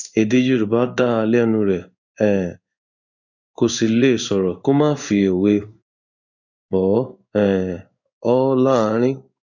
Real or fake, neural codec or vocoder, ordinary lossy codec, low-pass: fake; codec, 16 kHz in and 24 kHz out, 1 kbps, XY-Tokenizer; none; 7.2 kHz